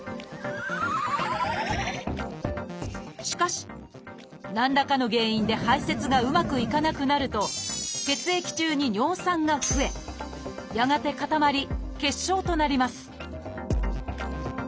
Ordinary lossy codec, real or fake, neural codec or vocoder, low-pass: none; real; none; none